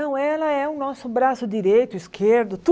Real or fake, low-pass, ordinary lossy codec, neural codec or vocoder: real; none; none; none